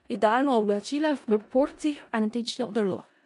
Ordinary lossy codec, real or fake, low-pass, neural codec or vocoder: MP3, 64 kbps; fake; 10.8 kHz; codec, 16 kHz in and 24 kHz out, 0.4 kbps, LongCat-Audio-Codec, four codebook decoder